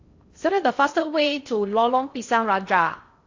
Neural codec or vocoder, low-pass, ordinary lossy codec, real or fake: codec, 16 kHz in and 24 kHz out, 0.6 kbps, FocalCodec, streaming, 2048 codes; 7.2 kHz; AAC, 48 kbps; fake